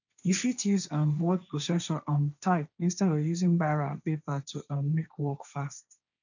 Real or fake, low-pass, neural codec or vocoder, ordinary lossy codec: fake; 7.2 kHz; codec, 16 kHz, 1.1 kbps, Voila-Tokenizer; none